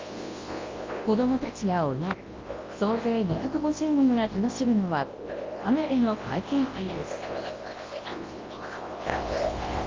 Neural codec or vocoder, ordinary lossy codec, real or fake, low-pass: codec, 24 kHz, 0.9 kbps, WavTokenizer, large speech release; Opus, 32 kbps; fake; 7.2 kHz